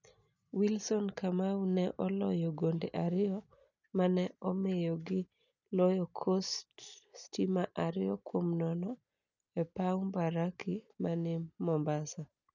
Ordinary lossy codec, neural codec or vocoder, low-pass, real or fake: none; none; 7.2 kHz; real